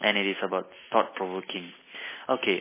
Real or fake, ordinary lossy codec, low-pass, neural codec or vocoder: real; MP3, 16 kbps; 3.6 kHz; none